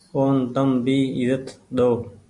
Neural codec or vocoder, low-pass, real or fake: none; 10.8 kHz; real